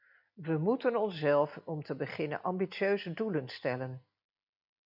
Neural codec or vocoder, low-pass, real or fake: none; 5.4 kHz; real